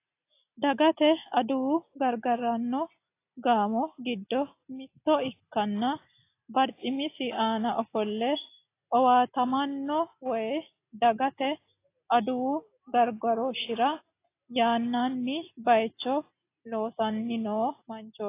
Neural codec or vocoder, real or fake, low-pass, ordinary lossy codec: none; real; 3.6 kHz; AAC, 24 kbps